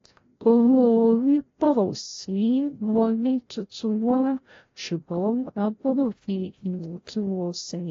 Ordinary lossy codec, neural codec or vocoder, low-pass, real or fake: AAC, 32 kbps; codec, 16 kHz, 0.5 kbps, FreqCodec, larger model; 7.2 kHz; fake